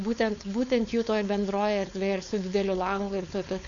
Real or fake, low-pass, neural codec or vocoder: fake; 7.2 kHz; codec, 16 kHz, 4.8 kbps, FACodec